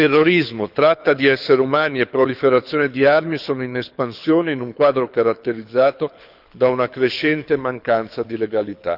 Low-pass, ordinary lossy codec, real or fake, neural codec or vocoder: 5.4 kHz; none; fake; codec, 24 kHz, 6 kbps, HILCodec